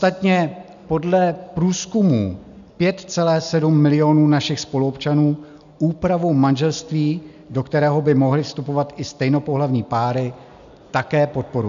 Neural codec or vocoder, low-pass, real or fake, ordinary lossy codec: none; 7.2 kHz; real; AAC, 96 kbps